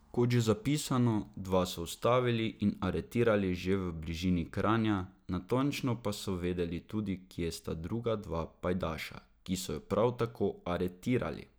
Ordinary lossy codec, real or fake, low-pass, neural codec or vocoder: none; real; none; none